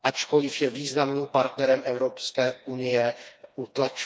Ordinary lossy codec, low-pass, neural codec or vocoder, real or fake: none; none; codec, 16 kHz, 2 kbps, FreqCodec, smaller model; fake